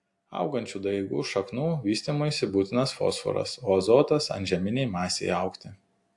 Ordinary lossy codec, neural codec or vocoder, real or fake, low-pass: AAC, 64 kbps; none; real; 10.8 kHz